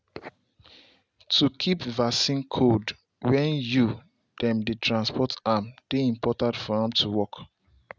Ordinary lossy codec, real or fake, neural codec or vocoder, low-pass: none; real; none; none